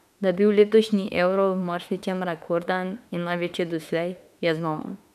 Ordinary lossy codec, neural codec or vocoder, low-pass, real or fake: none; autoencoder, 48 kHz, 32 numbers a frame, DAC-VAE, trained on Japanese speech; 14.4 kHz; fake